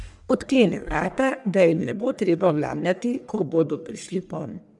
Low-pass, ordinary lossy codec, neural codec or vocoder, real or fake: 10.8 kHz; none; codec, 44.1 kHz, 1.7 kbps, Pupu-Codec; fake